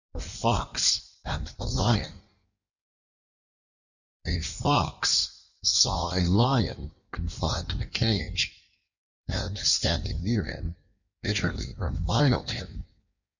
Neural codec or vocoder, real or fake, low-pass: codec, 16 kHz in and 24 kHz out, 1.1 kbps, FireRedTTS-2 codec; fake; 7.2 kHz